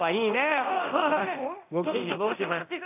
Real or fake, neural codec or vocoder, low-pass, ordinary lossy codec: fake; codec, 24 kHz, 0.9 kbps, DualCodec; 3.6 kHz; AAC, 32 kbps